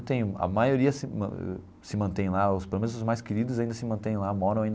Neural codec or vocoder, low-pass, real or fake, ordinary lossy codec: none; none; real; none